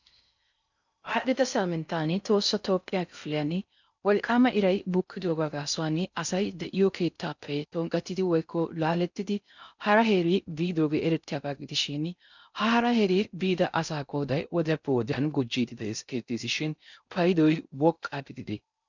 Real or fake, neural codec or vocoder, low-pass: fake; codec, 16 kHz in and 24 kHz out, 0.6 kbps, FocalCodec, streaming, 4096 codes; 7.2 kHz